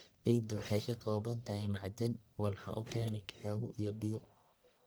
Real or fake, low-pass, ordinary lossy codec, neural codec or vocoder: fake; none; none; codec, 44.1 kHz, 1.7 kbps, Pupu-Codec